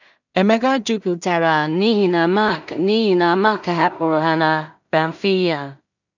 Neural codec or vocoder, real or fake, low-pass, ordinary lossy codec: codec, 16 kHz in and 24 kHz out, 0.4 kbps, LongCat-Audio-Codec, two codebook decoder; fake; 7.2 kHz; none